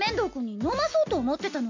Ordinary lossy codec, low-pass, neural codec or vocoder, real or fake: MP3, 48 kbps; 7.2 kHz; none; real